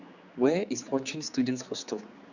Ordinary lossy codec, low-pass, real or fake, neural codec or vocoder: Opus, 64 kbps; 7.2 kHz; fake; codec, 16 kHz, 4 kbps, X-Codec, HuBERT features, trained on general audio